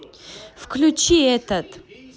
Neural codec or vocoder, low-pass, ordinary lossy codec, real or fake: none; none; none; real